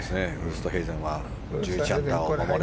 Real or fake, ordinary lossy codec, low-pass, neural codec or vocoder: real; none; none; none